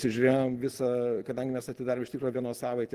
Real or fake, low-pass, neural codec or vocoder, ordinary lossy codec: real; 14.4 kHz; none; Opus, 16 kbps